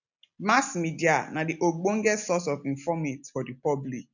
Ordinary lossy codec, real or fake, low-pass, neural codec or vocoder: none; real; 7.2 kHz; none